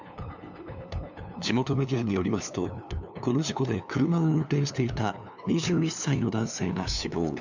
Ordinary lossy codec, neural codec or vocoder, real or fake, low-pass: none; codec, 16 kHz, 2 kbps, FunCodec, trained on LibriTTS, 25 frames a second; fake; 7.2 kHz